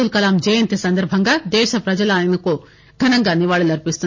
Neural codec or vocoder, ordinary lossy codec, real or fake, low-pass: none; MP3, 32 kbps; real; 7.2 kHz